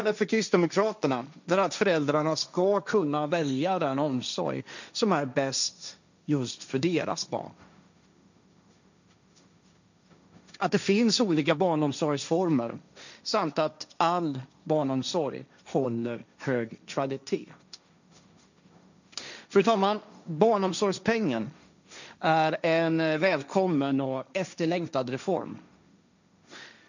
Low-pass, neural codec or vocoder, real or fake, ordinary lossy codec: 7.2 kHz; codec, 16 kHz, 1.1 kbps, Voila-Tokenizer; fake; none